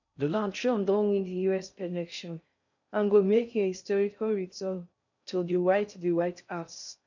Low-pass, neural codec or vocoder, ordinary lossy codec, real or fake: 7.2 kHz; codec, 16 kHz in and 24 kHz out, 0.6 kbps, FocalCodec, streaming, 4096 codes; none; fake